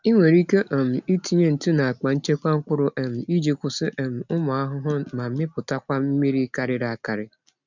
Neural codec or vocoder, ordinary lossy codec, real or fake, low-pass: none; none; real; 7.2 kHz